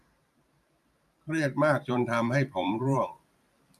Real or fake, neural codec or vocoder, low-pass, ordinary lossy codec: fake; vocoder, 44.1 kHz, 128 mel bands every 512 samples, BigVGAN v2; 14.4 kHz; none